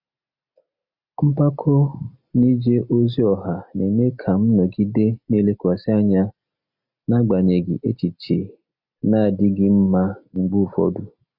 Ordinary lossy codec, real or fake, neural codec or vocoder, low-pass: none; real; none; 5.4 kHz